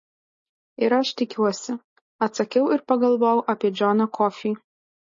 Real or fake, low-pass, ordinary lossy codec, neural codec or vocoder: real; 7.2 kHz; MP3, 32 kbps; none